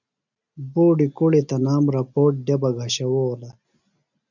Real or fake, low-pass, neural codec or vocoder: real; 7.2 kHz; none